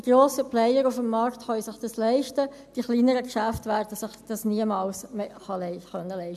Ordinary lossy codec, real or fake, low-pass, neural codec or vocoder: MP3, 96 kbps; real; 14.4 kHz; none